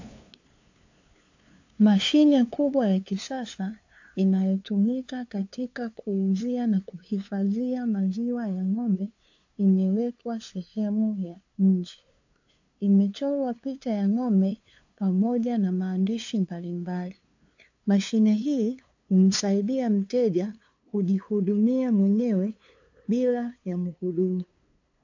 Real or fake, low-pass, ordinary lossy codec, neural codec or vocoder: fake; 7.2 kHz; AAC, 48 kbps; codec, 16 kHz, 2 kbps, FunCodec, trained on LibriTTS, 25 frames a second